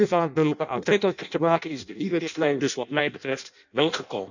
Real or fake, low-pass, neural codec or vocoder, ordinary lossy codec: fake; 7.2 kHz; codec, 16 kHz in and 24 kHz out, 0.6 kbps, FireRedTTS-2 codec; none